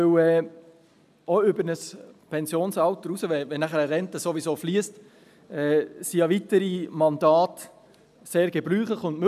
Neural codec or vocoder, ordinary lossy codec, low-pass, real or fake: none; none; 14.4 kHz; real